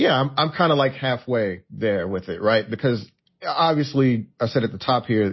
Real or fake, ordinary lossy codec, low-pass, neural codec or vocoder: real; MP3, 24 kbps; 7.2 kHz; none